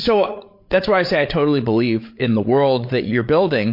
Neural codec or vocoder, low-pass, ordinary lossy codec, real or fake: codec, 16 kHz, 8 kbps, FreqCodec, larger model; 5.4 kHz; MP3, 32 kbps; fake